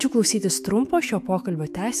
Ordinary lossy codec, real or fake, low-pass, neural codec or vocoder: AAC, 96 kbps; fake; 14.4 kHz; autoencoder, 48 kHz, 128 numbers a frame, DAC-VAE, trained on Japanese speech